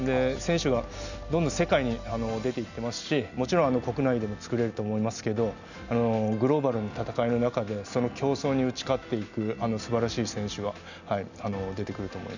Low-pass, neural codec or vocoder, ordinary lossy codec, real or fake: 7.2 kHz; none; none; real